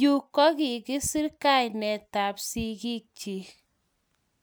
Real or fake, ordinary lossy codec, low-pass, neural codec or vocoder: real; none; none; none